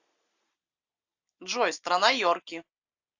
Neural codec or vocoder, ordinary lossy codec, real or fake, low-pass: none; AAC, 48 kbps; real; 7.2 kHz